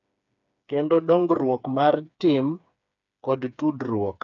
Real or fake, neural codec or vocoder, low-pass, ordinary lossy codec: fake; codec, 16 kHz, 4 kbps, FreqCodec, smaller model; 7.2 kHz; none